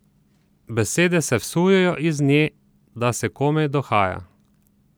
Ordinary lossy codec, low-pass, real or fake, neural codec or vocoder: none; none; real; none